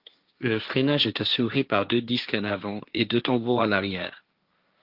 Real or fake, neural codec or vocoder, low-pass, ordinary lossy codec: fake; codec, 16 kHz, 1.1 kbps, Voila-Tokenizer; 5.4 kHz; Opus, 24 kbps